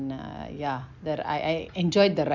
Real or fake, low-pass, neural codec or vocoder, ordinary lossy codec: real; 7.2 kHz; none; none